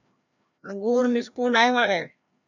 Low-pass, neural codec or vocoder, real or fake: 7.2 kHz; codec, 16 kHz, 1 kbps, FreqCodec, larger model; fake